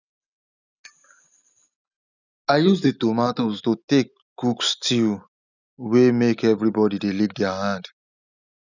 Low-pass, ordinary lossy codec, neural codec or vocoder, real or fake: 7.2 kHz; none; none; real